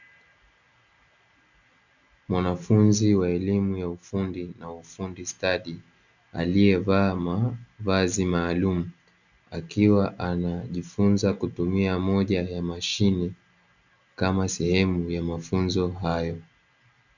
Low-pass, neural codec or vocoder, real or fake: 7.2 kHz; none; real